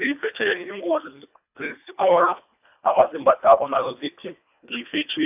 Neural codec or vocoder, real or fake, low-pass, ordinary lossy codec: codec, 24 kHz, 1.5 kbps, HILCodec; fake; 3.6 kHz; AAC, 32 kbps